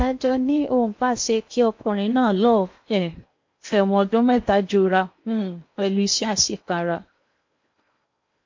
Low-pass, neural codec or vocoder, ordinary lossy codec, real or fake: 7.2 kHz; codec, 16 kHz in and 24 kHz out, 0.8 kbps, FocalCodec, streaming, 65536 codes; MP3, 48 kbps; fake